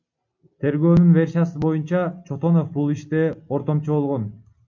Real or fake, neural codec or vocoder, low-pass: real; none; 7.2 kHz